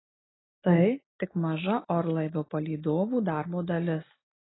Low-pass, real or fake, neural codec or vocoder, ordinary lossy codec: 7.2 kHz; real; none; AAC, 16 kbps